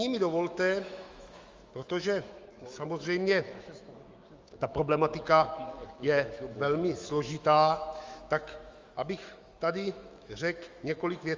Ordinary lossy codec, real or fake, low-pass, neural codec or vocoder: Opus, 32 kbps; fake; 7.2 kHz; autoencoder, 48 kHz, 128 numbers a frame, DAC-VAE, trained on Japanese speech